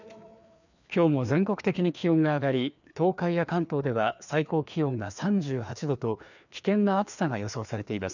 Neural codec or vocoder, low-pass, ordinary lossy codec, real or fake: codec, 16 kHz, 2 kbps, FreqCodec, larger model; 7.2 kHz; none; fake